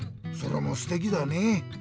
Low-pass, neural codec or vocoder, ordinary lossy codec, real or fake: none; none; none; real